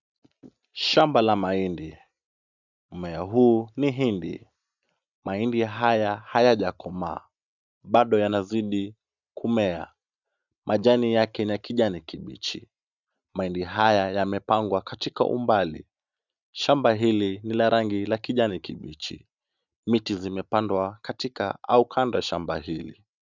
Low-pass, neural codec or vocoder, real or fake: 7.2 kHz; none; real